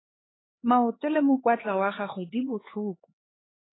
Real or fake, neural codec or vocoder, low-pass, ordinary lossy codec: fake; codec, 16 kHz, 4 kbps, X-Codec, HuBERT features, trained on LibriSpeech; 7.2 kHz; AAC, 16 kbps